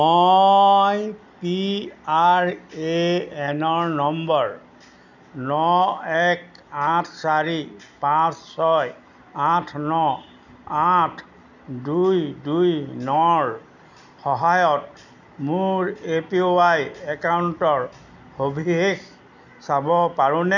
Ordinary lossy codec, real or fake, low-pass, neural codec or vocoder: none; real; 7.2 kHz; none